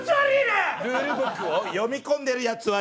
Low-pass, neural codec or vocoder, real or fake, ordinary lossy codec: none; none; real; none